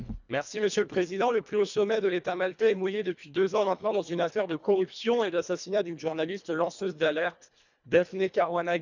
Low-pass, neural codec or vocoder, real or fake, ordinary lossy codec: 7.2 kHz; codec, 24 kHz, 1.5 kbps, HILCodec; fake; none